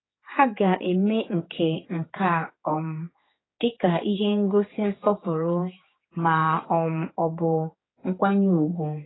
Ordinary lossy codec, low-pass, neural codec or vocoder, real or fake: AAC, 16 kbps; 7.2 kHz; codec, 16 kHz, 4 kbps, X-Codec, HuBERT features, trained on general audio; fake